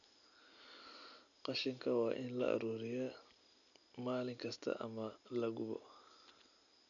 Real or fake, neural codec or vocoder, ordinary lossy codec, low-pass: real; none; none; 7.2 kHz